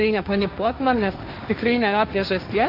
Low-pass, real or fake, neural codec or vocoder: 5.4 kHz; fake; codec, 16 kHz, 1.1 kbps, Voila-Tokenizer